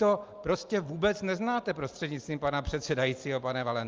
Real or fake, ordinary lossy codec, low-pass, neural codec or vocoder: real; Opus, 32 kbps; 7.2 kHz; none